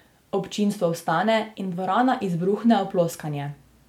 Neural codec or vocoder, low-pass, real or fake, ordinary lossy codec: none; 19.8 kHz; real; MP3, 96 kbps